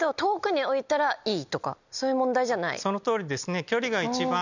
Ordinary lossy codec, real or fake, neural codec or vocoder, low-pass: none; real; none; 7.2 kHz